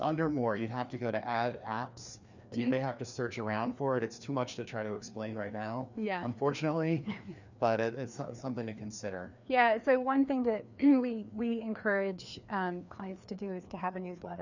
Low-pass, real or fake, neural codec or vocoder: 7.2 kHz; fake; codec, 16 kHz, 2 kbps, FreqCodec, larger model